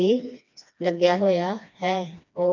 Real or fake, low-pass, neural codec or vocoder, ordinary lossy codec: fake; 7.2 kHz; codec, 16 kHz, 2 kbps, FreqCodec, smaller model; none